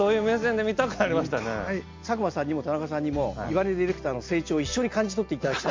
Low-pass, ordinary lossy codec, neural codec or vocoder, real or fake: 7.2 kHz; MP3, 48 kbps; none; real